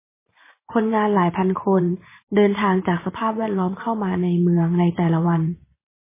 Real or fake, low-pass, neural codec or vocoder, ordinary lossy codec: fake; 3.6 kHz; vocoder, 44.1 kHz, 128 mel bands every 512 samples, BigVGAN v2; MP3, 16 kbps